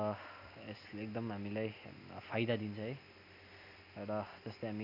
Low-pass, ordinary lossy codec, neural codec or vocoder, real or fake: 5.4 kHz; none; none; real